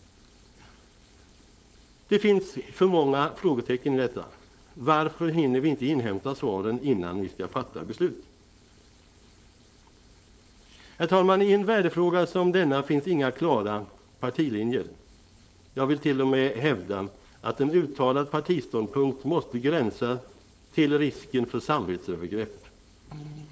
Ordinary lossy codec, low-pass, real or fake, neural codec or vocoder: none; none; fake; codec, 16 kHz, 4.8 kbps, FACodec